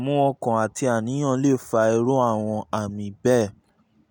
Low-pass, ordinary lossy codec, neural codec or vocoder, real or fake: none; none; none; real